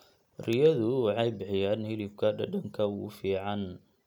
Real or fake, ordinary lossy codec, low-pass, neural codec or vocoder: real; none; 19.8 kHz; none